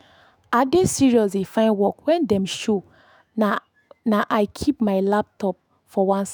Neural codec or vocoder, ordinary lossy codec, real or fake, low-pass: autoencoder, 48 kHz, 128 numbers a frame, DAC-VAE, trained on Japanese speech; none; fake; none